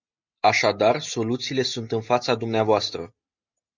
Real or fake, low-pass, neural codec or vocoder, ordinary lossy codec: real; 7.2 kHz; none; Opus, 64 kbps